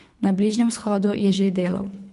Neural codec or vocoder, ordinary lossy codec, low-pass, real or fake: codec, 24 kHz, 3 kbps, HILCodec; MP3, 64 kbps; 10.8 kHz; fake